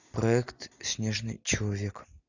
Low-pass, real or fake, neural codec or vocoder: 7.2 kHz; real; none